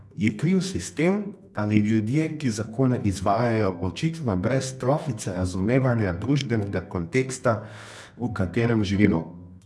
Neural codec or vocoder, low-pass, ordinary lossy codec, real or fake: codec, 24 kHz, 0.9 kbps, WavTokenizer, medium music audio release; none; none; fake